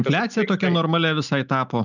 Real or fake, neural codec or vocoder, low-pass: real; none; 7.2 kHz